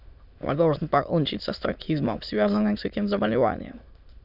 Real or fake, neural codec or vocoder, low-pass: fake; autoencoder, 22.05 kHz, a latent of 192 numbers a frame, VITS, trained on many speakers; 5.4 kHz